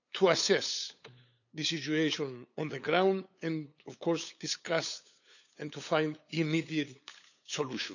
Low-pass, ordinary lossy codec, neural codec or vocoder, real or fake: 7.2 kHz; none; codec, 16 kHz, 8 kbps, FunCodec, trained on LibriTTS, 25 frames a second; fake